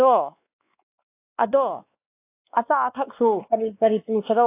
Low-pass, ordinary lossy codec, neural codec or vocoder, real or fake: 3.6 kHz; AAC, 24 kbps; codec, 16 kHz, 2 kbps, X-Codec, WavLM features, trained on Multilingual LibriSpeech; fake